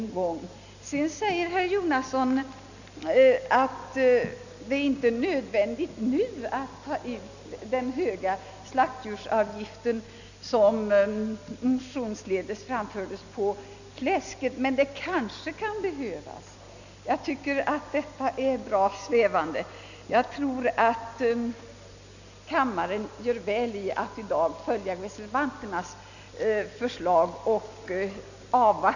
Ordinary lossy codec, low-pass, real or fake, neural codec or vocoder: none; 7.2 kHz; real; none